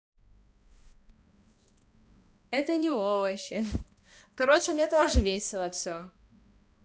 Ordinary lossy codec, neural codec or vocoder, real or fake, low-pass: none; codec, 16 kHz, 1 kbps, X-Codec, HuBERT features, trained on balanced general audio; fake; none